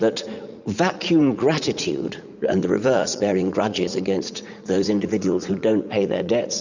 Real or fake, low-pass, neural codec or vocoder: fake; 7.2 kHz; vocoder, 44.1 kHz, 80 mel bands, Vocos